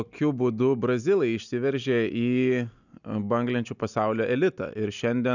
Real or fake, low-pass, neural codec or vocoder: real; 7.2 kHz; none